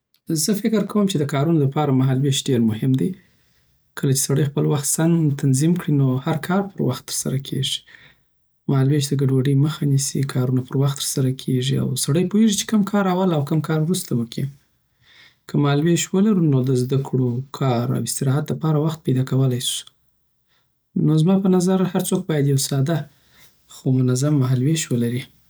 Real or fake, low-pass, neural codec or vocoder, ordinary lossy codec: real; none; none; none